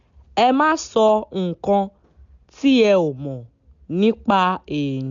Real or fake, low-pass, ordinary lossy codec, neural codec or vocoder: real; 7.2 kHz; none; none